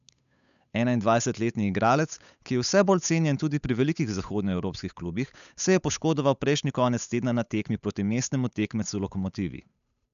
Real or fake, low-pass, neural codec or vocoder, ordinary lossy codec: fake; 7.2 kHz; codec, 16 kHz, 8 kbps, FunCodec, trained on Chinese and English, 25 frames a second; none